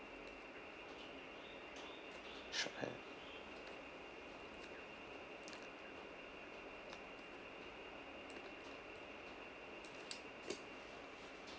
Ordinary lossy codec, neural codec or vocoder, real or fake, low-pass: none; none; real; none